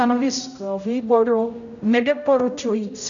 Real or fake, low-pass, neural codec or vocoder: fake; 7.2 kHz; codec, 16 kHz, 0.5 kbps, X-Codec, HuBERT features, trained on general audio